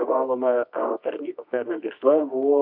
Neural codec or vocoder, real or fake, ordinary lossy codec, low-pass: codec, 24 kHz, 0.9 kbps, WavTokenizer, medium music audio release; fake; MP3, 48 kbps; 5.4 kHz